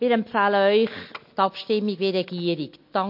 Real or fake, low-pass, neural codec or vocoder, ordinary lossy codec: real; 5.4 kHz; none; MP3, 32 kbps